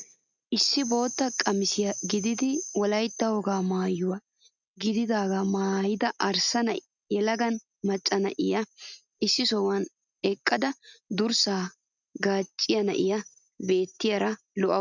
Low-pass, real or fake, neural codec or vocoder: 7.2 kHz; real; none